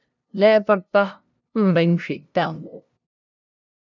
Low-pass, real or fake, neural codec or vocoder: 7.2 kHz; fake; codec, 16 kHz, 0.5 kbps, FunCodec, trained on LibriTTS, 25 frames a second